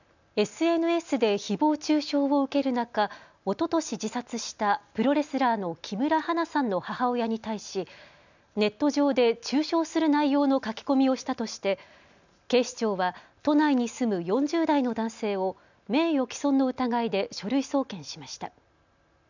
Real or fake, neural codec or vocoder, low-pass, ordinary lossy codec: real; none; 7.2 kHz; none